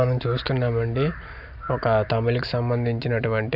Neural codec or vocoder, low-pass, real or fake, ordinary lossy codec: none; 5.4 kHz; real; none